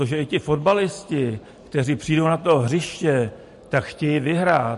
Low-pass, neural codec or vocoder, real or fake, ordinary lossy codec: 14.4 kHz; none; real; MP3, 48 kbps